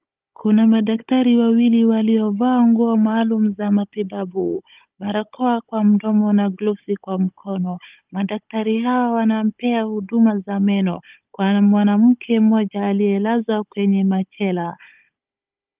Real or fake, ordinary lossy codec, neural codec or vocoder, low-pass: fake; Opus, 24 kbps; codec, 16 kHz, 16 kbps, FunCodec, trained on Chinese and English, 50 frames a second; 3.6 kHz